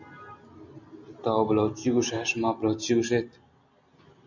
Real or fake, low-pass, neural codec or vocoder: real; 7.2 kHz; none